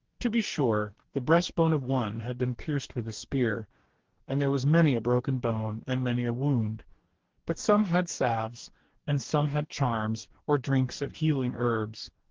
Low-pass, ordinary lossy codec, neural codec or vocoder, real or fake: 7.2 kHz; Opus, 16 kbps; codec, 44.1 kHz, 2.6 kbps, DAC; fake